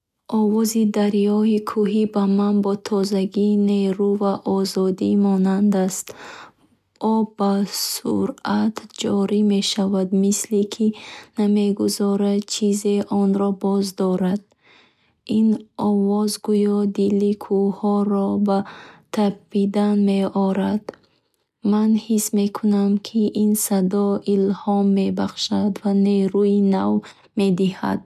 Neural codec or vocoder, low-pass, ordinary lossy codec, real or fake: none; 14.4 kHz; none; real